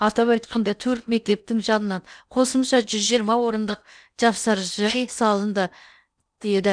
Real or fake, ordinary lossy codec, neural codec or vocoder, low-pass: fake; none; codec, 16 kHz in and 24 kHz out, 0.8 kbps, FocalCodec, streaming, 65536 codes; 9.9 kHz